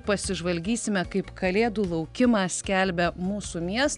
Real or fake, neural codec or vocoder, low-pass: real; none; 10.8 kHz